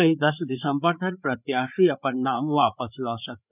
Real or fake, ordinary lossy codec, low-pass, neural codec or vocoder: fake; none; 3.6 kHz; codec, 16 kHz, 4 kbps, FreqCodec, larger model